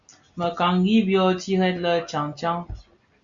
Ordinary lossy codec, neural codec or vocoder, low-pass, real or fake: Opus, 64 kbps; none; 7.2 kHz; real